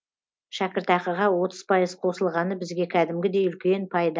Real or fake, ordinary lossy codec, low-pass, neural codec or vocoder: real; none; none; none